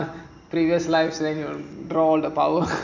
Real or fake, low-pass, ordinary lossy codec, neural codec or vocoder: real; 7.2 kHz; none; none